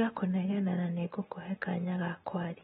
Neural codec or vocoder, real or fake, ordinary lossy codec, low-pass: none; real; AAC, 16 kbps; 7.2 kHz